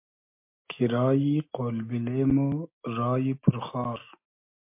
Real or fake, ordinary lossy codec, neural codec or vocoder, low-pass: real; MP3, 32 kbps; none; 3.6 kHz